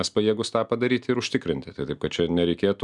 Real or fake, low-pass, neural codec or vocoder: real; 10.8 kHz; none